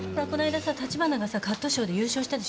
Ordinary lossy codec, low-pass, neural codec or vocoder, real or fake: none; none; none; real